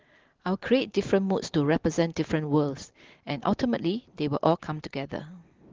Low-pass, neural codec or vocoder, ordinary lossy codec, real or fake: 7.2 kHz; none; Opus, 16 kbps; real